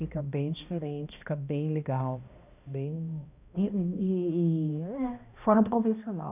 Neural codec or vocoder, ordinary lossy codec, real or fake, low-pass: codec, 16 kHz, 1 kbps, X-Codec, HuBERT features, trained on balanced general audio; none; fake; 3.6 kHz